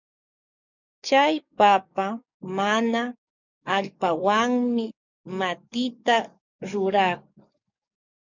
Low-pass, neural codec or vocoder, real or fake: 7.2 kHz; codec, 44.1 kHz, 7.8 kbps, DAC; fake